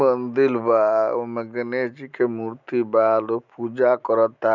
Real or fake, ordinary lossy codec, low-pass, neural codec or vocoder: real; none; 7.2 kHz; none